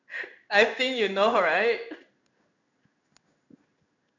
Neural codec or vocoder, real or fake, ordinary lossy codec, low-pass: codec, 16 kHz in and 24 kHz out, 1 kbps, XY-Tokenizer; fake; none; 7.2 kHz